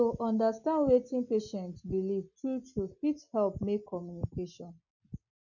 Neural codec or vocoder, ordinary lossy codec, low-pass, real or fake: none; none; 7.2 kHz; real